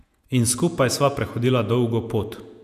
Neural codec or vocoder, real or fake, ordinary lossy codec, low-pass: vocoder, 48 kHz, 128 mel bands, Vocos; fake; none; 14.4 kHz